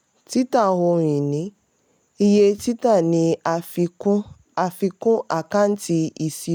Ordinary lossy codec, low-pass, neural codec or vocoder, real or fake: none; none; none; real